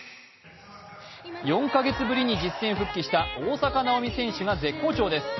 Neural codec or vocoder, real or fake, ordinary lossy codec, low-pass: none; real; MP3, 24 kbps; 7.2 kHz